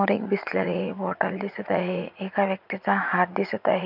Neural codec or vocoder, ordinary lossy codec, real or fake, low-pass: vocoder, 44.1 kHz, 80 mel bands, Vocos; none; fake; 5.4 kHz